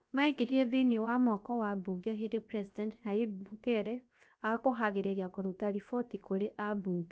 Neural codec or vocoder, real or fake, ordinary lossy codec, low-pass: codec, 16 kHz, 0.7 kbps, FocalCodec; fake; none; none